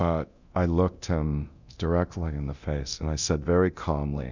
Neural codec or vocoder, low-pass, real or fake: codec, 24 kHz, 0.9 kbps, DualCodec; 7.2 kHz; fake